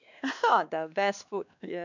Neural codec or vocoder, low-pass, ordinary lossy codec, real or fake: codec, 16 kHz, 2 kbps, X-Codec, WavLM features, trained on Multilingual LibriSpeech; 7.2 kHz; none; fake